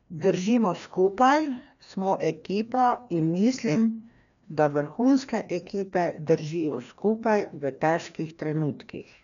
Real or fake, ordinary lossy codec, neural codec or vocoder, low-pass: fake; none; codec, 16 kHz, 1 kbps, FreqCodec, larger model; 7.2 kHz